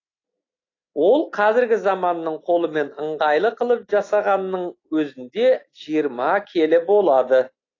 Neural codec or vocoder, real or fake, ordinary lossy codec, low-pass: none; real; AAC, 32 kbps; 7.2 kHz